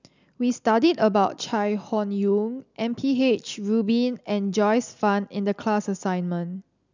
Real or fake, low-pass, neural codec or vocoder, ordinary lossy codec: real; 7.2 kHz; none; none